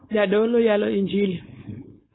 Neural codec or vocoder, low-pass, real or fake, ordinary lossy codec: codec, 16 kHz, 4.8 kbps, FACodec; 7.2 kHz; fake; AAC, 16 kbps